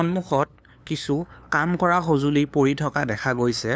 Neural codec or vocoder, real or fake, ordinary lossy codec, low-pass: codec, 16 kHz, 2 kbps, FunCodec, trained on LibriTTS, 25 frames a second; fake; none; none